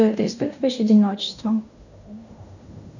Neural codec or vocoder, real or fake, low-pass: codec, 16 kHz in and 24 kHz out, 0.9 kbps, LongCat-Audio-Codec, fine tuned four codebook decoder; fake; 7.2 kHz